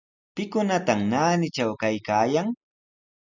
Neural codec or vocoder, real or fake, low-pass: none; real; 7.2 kHz